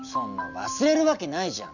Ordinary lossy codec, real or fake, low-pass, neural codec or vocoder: none; real; 7.2 kHz; none